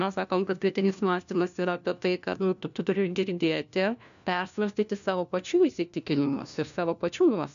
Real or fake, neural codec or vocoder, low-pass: fake; codec, 16 kHz, 1 kbps, FunCodec, trained on LibriTTS, 50 frames a second; 7.2 kHz